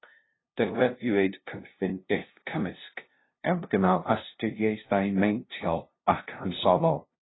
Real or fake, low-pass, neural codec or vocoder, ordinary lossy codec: fake; 7.2 kHz; codec, 16 kHz, 0.5 kbps, FunCodec, trained on LibriTTS, 25 frames a second; AAC, 16 kbps